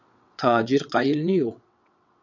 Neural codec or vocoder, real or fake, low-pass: vocoder, 22.05 kHz, 80 mel bands, WaveNeXt; fake; 7.2 kHz